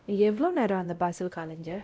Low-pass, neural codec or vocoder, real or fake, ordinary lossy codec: none; codec, 16 kHz, 0.5 kbps, X-Codec, WavLM features, trained on Multilingual LibriSpeech; fake; none